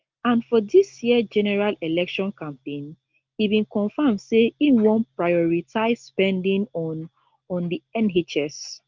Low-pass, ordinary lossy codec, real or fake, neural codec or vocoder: 7.2 kHz; Opus, 32 kbps; real; none